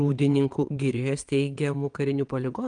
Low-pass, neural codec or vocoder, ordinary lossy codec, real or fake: 9.9 kHz; vocoder, 22.05 kHz, 80 mel bands, WaveNeXt; Opus, 32 kbps; fake